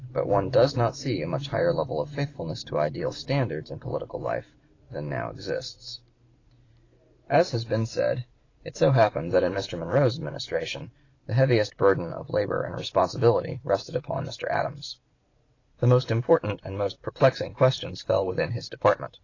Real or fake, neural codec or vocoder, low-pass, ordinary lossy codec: fake; vocoder, 44.1 kHz, 128 mel bands every 512 samples, BigVGAN v2; 7.2 kHz; AAC, 32 kbps